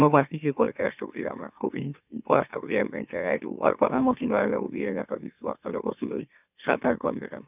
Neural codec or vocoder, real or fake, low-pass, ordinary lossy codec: autoencoder, 44.1 kHz, a latent of 192 numbers a frame, MeloTTS; fake; 3.6 kHz; none